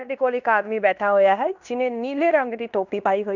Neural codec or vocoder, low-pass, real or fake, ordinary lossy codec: codec, 16 kHz in and 24 kHz out, 0.9 kbps, LongCat-Audio-Codec, fine tuned four codebook decoder; 7.2 kHz; fake; none